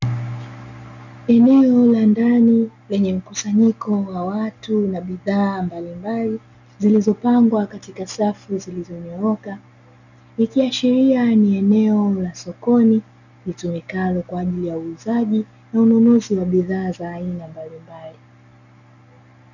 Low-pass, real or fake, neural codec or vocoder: 7.2 kHz; real; none